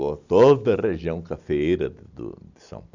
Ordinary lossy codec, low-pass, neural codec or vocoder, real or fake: none; 7.2 kHz; none; real